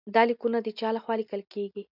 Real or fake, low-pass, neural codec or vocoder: real; 5.4 kHz; none